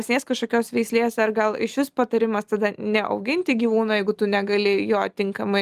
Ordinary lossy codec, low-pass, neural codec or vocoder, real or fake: Opus, 32 kbps; 14.4 kHz; none; real